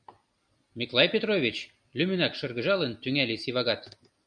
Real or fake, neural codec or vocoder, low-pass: real; none; 9.9 kHz